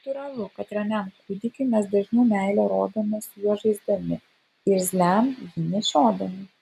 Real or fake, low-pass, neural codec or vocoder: real; 14.4 kHz; none